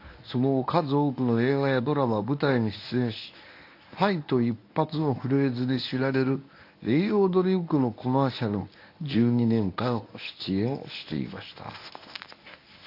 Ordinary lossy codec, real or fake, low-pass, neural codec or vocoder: AAC, 32 kbps; fake; 5.4 kHz; codec, 24 kHz, 0.9 kbps, WavTokenizer, medium speech release version 1